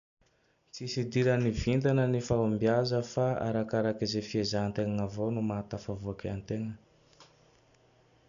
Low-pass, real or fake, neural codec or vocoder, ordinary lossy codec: 7.2 kHz; real; none; none